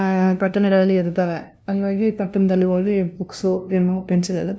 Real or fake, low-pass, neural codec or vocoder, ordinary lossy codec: fake; none; codec, 16 kHz, 0.5 kbps, FunCodec, trained on LibriTTS, 25 frames a second; none